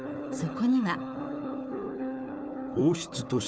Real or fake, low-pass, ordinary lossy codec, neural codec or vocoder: fake; none; none; codec, 16 kHz, 4 kbps, FunCodec, trained on Chinese and English, 50 frames a second